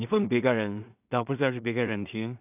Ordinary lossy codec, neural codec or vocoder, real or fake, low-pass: none; codec, 16 kHz in and 24 kHz out, 0.4 kbps, LongCat-Audio-Codec, two codebook decoder; fake; 3.6 kHz